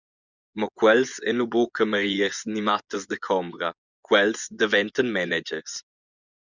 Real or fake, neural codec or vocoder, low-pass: fake; vocoder, 24 kHz, 100 mel bands, Vocos; 7.2 kHz